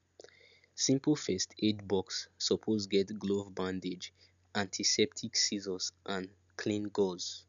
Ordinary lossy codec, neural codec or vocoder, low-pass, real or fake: none; none; 7.2 kHz; real